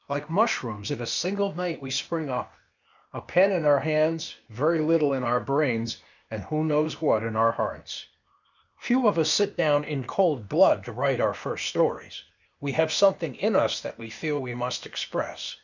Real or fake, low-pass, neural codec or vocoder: fake; 7.2 kHz; codec, 16 kHz, 0.8 kbps, ZipCodec